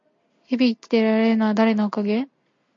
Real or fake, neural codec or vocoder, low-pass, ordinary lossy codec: real; none; 7.2 kHz; MP3, 48 kbps